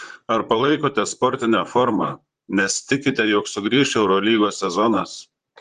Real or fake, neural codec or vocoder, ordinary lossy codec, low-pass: fake; vocoder, 44.1 kHz, 128 mel bands, Pupu-Vocoder; Opus, 24 kbps; 14.4 kHz